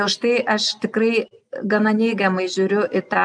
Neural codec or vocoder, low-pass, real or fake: none; 9.9 kHz; real